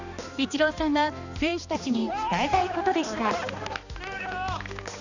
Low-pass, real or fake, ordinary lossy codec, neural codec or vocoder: 7.2 kHz; fake; none; codec, 16 kHz, 2 kbps, X-Codec, HuBERT features, trained on balanced general audio